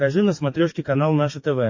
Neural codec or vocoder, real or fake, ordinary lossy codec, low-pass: codec, 44.1 kHz, 7.8 kbps, DAC; fake; MP3, 32 kbps; 7.2 kHz